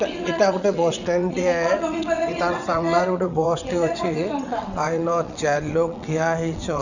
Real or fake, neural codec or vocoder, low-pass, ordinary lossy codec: fake; vocoder, 22.05 kHz, 80 mel bands, WaveNeXt; 7.2 kHz; none